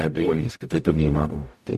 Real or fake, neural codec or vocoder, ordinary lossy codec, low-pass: fake; codec, 44.1 kHz, 0.9 kbps, DAC; MP3, 64 kbps; 14.4 kHz